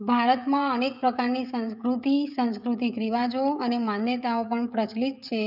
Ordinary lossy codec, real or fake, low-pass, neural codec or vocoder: none; fake; 5.4 kHz; codec, 16 kHz, 16 kbps, FreqCodec, smaller model